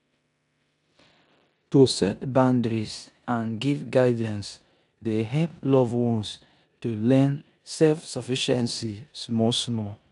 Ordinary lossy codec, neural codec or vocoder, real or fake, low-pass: none; codec, 16 kHz in and 24 kHz out, 0.9 kbps, LongCat-Audio-Codec, four codebook decoder; fake; 10.8 kHz